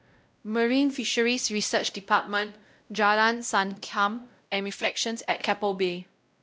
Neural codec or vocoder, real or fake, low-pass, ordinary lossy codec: codec, 16 kHz, 0.5 kbps, X-Codec, WavLM features, trained on Multilingual LibriSpeech; fake; none; none